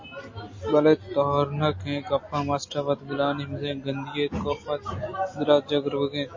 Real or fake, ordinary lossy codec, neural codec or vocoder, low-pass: real; MP3, 32 kbps; none; 7.2 kHz